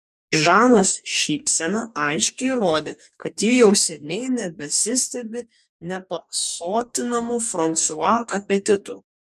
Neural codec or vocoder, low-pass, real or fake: codec, 44.1 kHz, 2.6 kbps, DAC; 14.4 kHz; fake